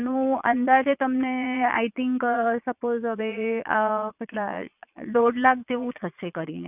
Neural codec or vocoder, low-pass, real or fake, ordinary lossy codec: vocoder, 22.05 kHz, 80 mel bands, Vocos; 3.6 kHz; fake; none